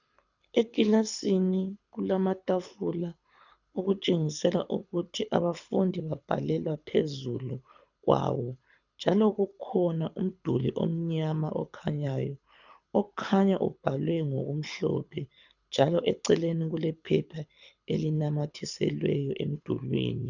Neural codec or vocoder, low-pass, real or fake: codec, 24 kHz, 6 kbps, HILCodec; 7.2 kHz; fake